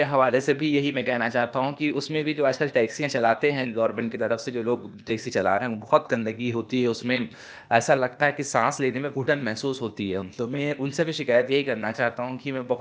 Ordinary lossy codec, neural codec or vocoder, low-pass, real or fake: none; codec, 16 kHz, 0.8 kbps, ZipCodec; none; fake